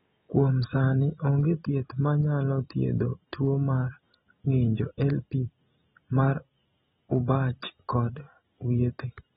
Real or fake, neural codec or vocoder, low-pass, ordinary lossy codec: real; none; 19.8 kHz; AAC, 16 kbps